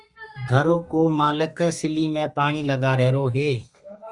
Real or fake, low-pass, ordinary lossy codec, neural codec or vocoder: fake; 10.8 kHz; Opus, 64 kbps; codec, 32 kHz, 1.9 kbps, SNAC